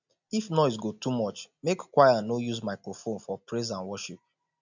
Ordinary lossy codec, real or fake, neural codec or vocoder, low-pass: none; real; none; 7.2 kHz